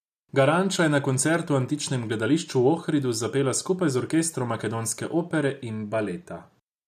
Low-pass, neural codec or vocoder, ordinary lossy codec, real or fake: 14.4 kHz; none; none; real